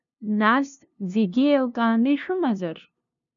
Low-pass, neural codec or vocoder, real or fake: 7.2 kHz; codec, 16 kHz, 0.5 kbps, FunCodec, trained on LibriTTS, 25 frames a second; fake